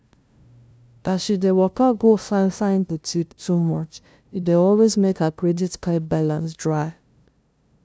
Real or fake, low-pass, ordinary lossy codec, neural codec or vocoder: fake; none; none; codec, 16 kHz, 0.5 kbps, FunCodec, trained on LibriTTS, 25 frames a second